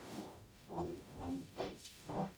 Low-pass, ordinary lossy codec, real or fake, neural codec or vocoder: none; none; fake; codec, 44.1 kHz, 0.9 kbps, DAC